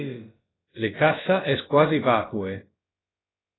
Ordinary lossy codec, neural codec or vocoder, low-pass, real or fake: AAC, 16 kbps; codec, 16 kHz, about 1 kbps, DyCAST, with the encoder's durations; 7.2 kHz; fake